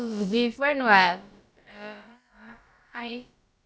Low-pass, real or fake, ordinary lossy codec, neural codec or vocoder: none; fake; none; codec, 16 kHz, about 1 kbps, DyCAST, with the encoder's durations